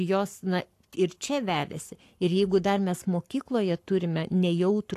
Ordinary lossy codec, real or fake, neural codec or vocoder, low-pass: AAC, 64 kbps; fake; codec, 44.1 kHz, 7.8 kbps, Pupu-Codec; 14.4 kHz